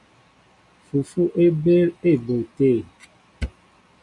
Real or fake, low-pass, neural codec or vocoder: real; 10.8 kHz; none